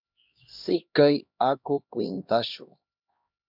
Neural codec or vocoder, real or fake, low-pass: codec, 16 kHz, 1 kbps, X-Codec, HuBERT features, trained on LibriSpeech; fake; 5.4 kHz